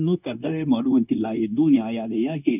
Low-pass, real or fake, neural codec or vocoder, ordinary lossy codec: 3.6 kHz; fake; codec, 16 kHz, 0.9 kbps, LongCat-Audio-Codec; none